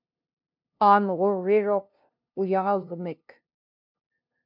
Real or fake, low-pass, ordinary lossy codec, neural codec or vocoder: fake; 5.4 kHz; MP3, 48 kbps; codec, 16 kHz, 0.5 kbps, FunCodec, trained on LibriTTS, 25 frames a second